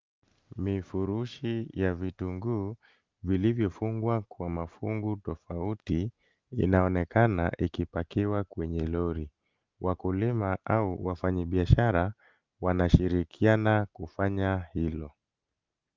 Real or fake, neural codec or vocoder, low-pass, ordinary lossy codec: real; none; 7.2 kHz; Opus, 32 kbps